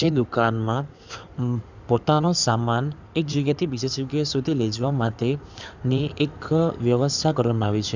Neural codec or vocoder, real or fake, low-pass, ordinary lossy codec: codec, 16 kHz in and 24 kHz out, 2.2 kbps, FireRedTTS-2 codec; fake; 7.2 kHz; none